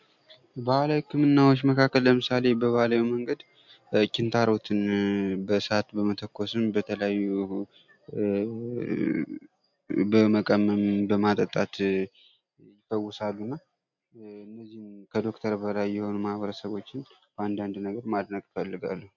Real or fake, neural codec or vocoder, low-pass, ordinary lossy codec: real; none; 7.2 kHz; MP3, 64 kbps